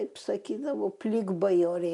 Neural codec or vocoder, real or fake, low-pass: none; real; 10.8 kHz